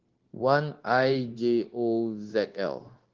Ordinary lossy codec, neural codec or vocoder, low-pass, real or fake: Opus, 16 kbps; codec, 16 kHz, 0.9 kbps, LongCat-Audio-Codec; 7.2 kHz; fake